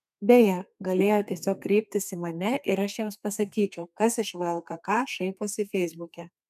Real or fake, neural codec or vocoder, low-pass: fake; codec, 32 kHz, 1.9 kbps, SNAC; 14.4 kHz